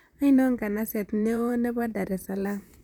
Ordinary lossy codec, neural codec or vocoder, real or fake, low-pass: none; vocoder, 44.1 kHz, 128 mel bands, Pupu-Vocoder; fake; none